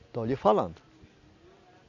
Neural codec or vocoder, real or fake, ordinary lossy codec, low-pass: none; real; none; 7.2 kHz